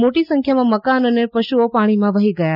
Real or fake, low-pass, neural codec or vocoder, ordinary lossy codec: real; 5.4 kHz; none; none